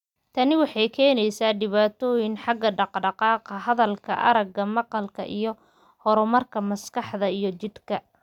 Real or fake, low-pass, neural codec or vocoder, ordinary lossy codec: real; 19.8 kHz; none; none